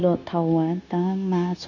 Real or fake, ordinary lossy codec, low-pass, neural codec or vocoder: fake; none; 7.2 kHz; codec, 16 kHz, 0.9 kbps, LongCat-Audio-Codec